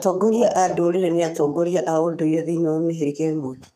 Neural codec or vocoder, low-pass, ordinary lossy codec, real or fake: codec, 32 kHz, 1.9 kbps, SNAC; 14.4 kHz; none; fake